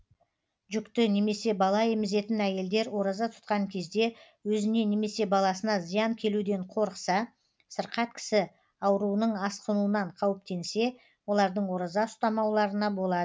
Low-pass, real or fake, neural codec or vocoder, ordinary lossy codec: none; real; none; none